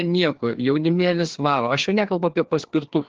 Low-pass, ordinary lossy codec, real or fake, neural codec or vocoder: 7.2 kHz; Opus, 16 kbps; fake; codec, 16 kHz, 2 kbps, FreqCodec, larger model